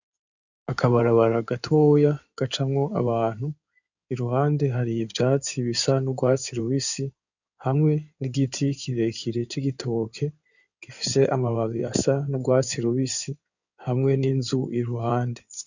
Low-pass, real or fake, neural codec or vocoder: 7.2 kHz; fake; codec, 16 kHz in and 24 kHz out, 2.2 kbps, FireRedTTS-2 codec